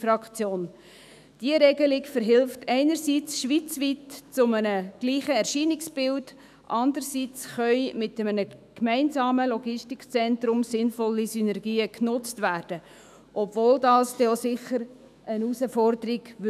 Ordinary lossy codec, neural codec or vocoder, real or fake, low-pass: none; autoencoder, 48 kHz, 128 numbers a frame, DAC-VAE, trained on Japanese speech; fake; 14.4 kHz